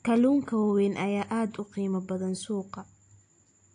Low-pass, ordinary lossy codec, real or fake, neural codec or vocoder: 9.9 kHz; AAC, 48 kbps; real; none